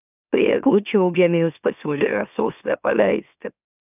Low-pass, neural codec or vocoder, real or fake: 3.6 kHz; autoencoder, 44.1 kHz, a latent of 192 numbers a frame, MeloTTS; fake